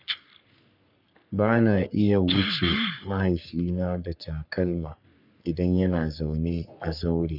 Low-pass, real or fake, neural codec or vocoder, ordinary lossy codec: 5.4 kHz; fake; codec, 44.1 kHz, 3.4 kbps, Pupu-Codec; none